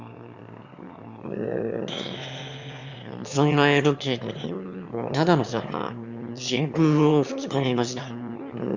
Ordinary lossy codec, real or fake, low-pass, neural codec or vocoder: Opus, 64 kbps; fake; 7.2 kHz; autoencoder, 22.05 kHz, a latent of 192 numbers a frame, VITS, trained on one speaker